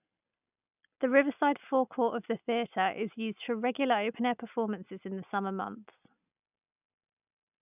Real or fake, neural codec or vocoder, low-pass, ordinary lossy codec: real; none; 3.6 kHz; none